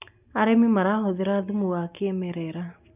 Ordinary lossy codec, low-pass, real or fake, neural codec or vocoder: none; 3.6 kHz; real; none